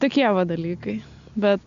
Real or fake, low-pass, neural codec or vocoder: real; 7.2 kHz; none